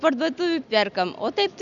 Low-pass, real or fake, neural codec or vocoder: 7.2 kHz; real; none